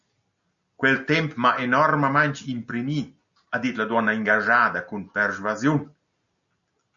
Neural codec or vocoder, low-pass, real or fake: none; 7.2 kHz; real